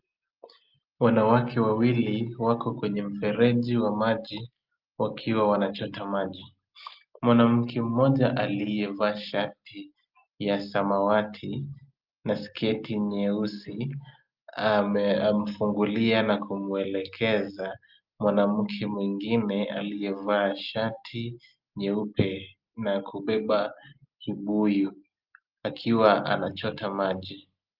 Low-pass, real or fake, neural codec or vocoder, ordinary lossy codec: 5.4 kHz; real; none; Opus, 24 kbps